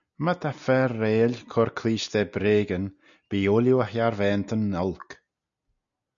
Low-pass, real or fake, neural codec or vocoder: 7.2 kHz; real; none